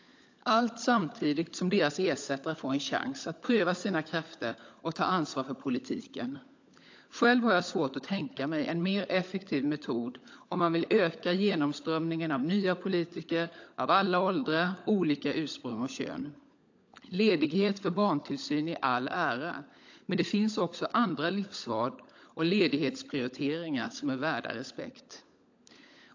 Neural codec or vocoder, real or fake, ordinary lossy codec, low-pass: codec, 16 kHz, 16 kbps, FunCodec, trained on LibriTTS, 50 frames a second; fake; AAC, 48 kbps; 7.2 kHz